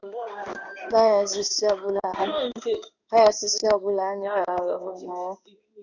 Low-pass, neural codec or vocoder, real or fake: 7.2 kHz; codec, 16 kHz in and 24 kHz out, 1 kbps, XY-Tokenizer; fake